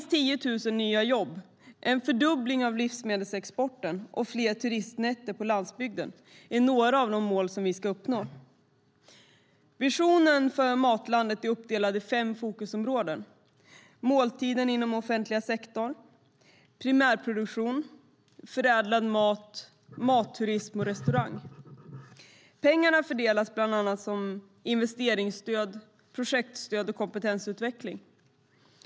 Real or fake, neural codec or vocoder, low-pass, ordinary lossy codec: real; none; none; none